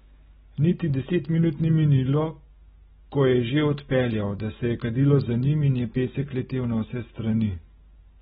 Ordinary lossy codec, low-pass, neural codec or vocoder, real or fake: AAC, 16 kbps; 19.8 kHz; none; real